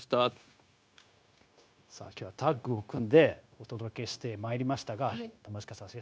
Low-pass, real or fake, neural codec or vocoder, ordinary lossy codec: none; fake; codec, 16 kHz, 0.9 kbps, LongCat-Audio-Codec; none